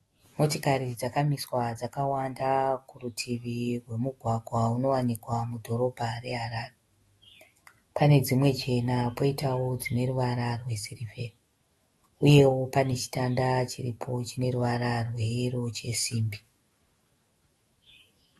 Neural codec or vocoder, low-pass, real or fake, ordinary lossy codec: autoencoder, 48 kHz, 128 numbers a frame, DAC-VAE, trained on Japanese speech; 19.8 kHz; fake; AAC, 32 kbps